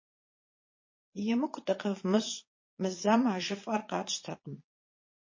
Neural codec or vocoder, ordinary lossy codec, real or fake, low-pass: vocoder, 22.05 kHz, 80 mel bands, Vocos; MP3, 32 kbps; fake; 7.2 kHz